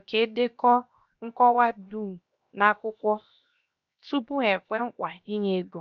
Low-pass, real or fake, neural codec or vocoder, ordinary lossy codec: 7.2 kHz; fake; codec, 16 kHz, 0.7 kbps, FocalCodec; none